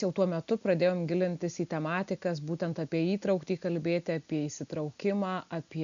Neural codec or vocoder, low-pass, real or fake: none; 7.2 kHz; real